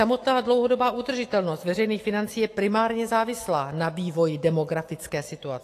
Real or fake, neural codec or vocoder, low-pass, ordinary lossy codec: fake; autoencoder, 48 kHz, 128 numbers a frame, DAC-VAE, trained on Japanese speech; 14.4 kHz; AAC, 48 kbps